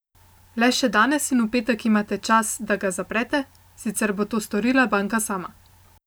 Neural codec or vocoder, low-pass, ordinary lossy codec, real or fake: none; none; none; real